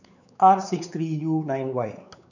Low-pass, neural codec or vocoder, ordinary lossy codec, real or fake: 7.2 kHz; codec, 16 kHz, 4 kbps, X-Codec, WavLM features, trained on Multilingual LibriSpeech; none; fake